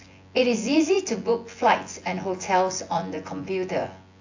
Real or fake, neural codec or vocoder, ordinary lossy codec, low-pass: fake; vocoder, 24 kHz, 100 mel bands, Vocos; AAC, 48 kbps; 7.2 kHz